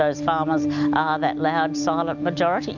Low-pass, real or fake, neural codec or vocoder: 7.2 kHz; real; none